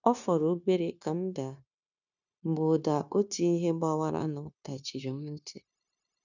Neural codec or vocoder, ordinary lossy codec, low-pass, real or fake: codec, 16 kHz, 0.9 kbps, LongCat-Audio-Codec; none; 7.2 kHz; fake